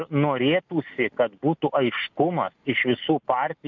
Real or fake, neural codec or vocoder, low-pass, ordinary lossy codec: real; none; 7.2 kHz; MP3, 64 kbps